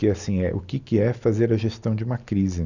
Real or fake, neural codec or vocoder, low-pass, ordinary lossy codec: real; none; 7.2 kHz; none